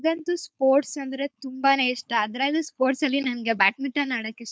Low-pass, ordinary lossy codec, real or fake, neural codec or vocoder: none; none; fake; codec, 16 kHz, 4.8 kbps, FACodec